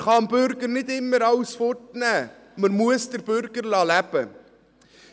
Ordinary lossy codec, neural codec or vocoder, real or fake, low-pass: none; none; real; none